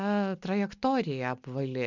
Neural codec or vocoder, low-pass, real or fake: none; 7.2 kHz; real